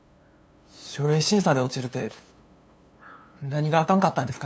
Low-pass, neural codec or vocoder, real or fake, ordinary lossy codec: none; codec, 16 kHz, 2 kbps, FunCodec, trained on LibriTTS, 25 frames a second; fake; none